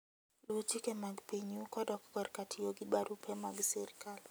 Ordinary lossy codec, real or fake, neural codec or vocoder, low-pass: none; real; none; none